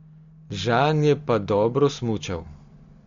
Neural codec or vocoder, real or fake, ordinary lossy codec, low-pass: none; real; MP3, 48 kbps; 7.2 kHz